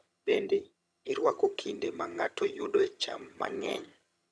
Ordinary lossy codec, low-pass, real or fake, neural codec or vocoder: none; none; fake; vocoder, 22.05 kHz, 80 mel bands, HiFi-GAN